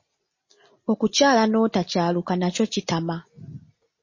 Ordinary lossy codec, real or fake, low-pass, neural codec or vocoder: MP3, 32 kbps; real; 7.2 kHz; none